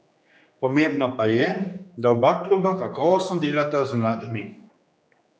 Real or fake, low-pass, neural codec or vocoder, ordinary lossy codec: fake; none; codec, 16 kHz, 2 kbps, X-Codec, HuBERT features, trained on general audio; none